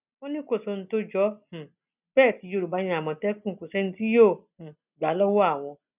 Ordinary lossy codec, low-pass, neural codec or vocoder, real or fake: none; 3.6 kHz; none; real